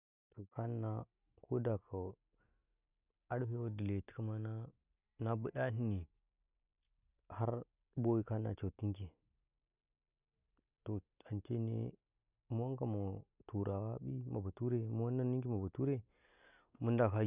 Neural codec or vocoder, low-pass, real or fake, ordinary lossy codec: none; 3.6 kHz; real; none